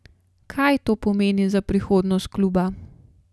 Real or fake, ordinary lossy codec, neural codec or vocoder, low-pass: real; none; none; none